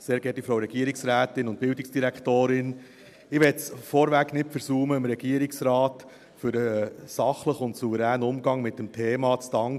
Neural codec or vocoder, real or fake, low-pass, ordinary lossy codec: none; real; 14.4 kHz; none